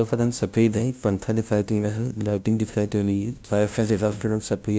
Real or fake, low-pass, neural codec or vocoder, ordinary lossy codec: fake; none; codec, 16 kHz, 0.5 kbps, FunCodec, trained on LibriTTS, 25 frames a second; none